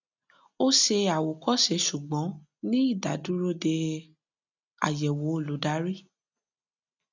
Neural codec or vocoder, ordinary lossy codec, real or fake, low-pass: none; none; real; 7.2 kHz